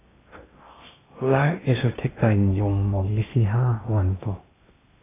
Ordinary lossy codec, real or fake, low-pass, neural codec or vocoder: AAC, 16 kbps; fake; 3.6 kHz; codec, 16 kHz in and 24 kHz out, 0.6 kbps, FocalCodec, streaming, 2048 codes